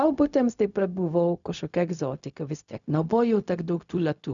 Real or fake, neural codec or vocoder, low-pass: fake; codec, 16 kHz, 0.4 kbps, LongCat-Audio-Codec; 7.2 kHz